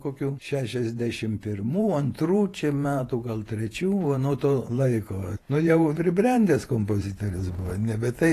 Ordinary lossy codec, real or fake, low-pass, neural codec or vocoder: AAC, 48 kbps; fake; 14.4 kHz; vocoder, 48 kHz, 128 mel bands, Vocos